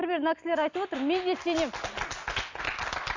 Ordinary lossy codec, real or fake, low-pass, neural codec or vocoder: AAC, 48 kbps; real; 7.2 kHz; none